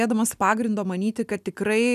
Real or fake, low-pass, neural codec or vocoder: real; 14.4 kHz; none